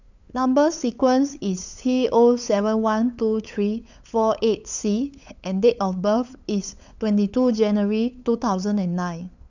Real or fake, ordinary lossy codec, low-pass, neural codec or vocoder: fake; none; 7.2 kHz; codec, 16 kHz, 8 kbps, FunCodec, trained on LibriTTS, 25 frames a second